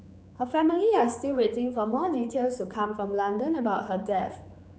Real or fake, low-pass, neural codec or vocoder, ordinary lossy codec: fake; none; codec, 16 kHz, 4 kbps, X-Codec, HuBERT features, trained on general audio; none